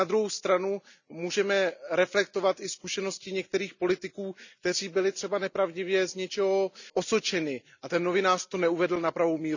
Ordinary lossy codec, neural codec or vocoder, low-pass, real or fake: none; none; 7.2 kHz; real